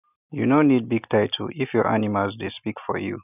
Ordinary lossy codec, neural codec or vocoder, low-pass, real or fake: none; none; 3.6 kHz; real